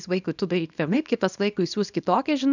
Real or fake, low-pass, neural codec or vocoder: fake; 7.2 kHz; codec, 24 kHz, 0.9 kbps, WavTokenizer, small release